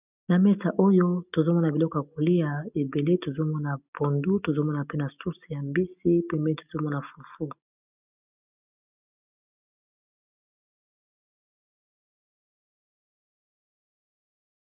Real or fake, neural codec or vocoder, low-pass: real; none; 3.6 kHz